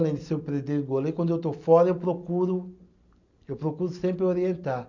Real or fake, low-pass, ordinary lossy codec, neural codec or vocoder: real; 7.2 kHz; none; none